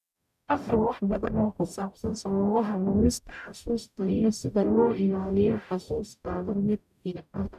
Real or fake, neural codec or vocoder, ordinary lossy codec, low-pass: fake; codec, 44.1 kHz, 0.9 kbps, DAC; none; 14.4 kHz